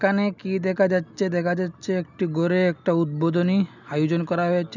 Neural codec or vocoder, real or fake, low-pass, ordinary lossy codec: none; real; 7.2 kHz; none